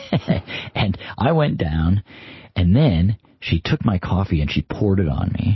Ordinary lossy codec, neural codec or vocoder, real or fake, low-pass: MP3, 24 kbps; none; real; 7.2 kHz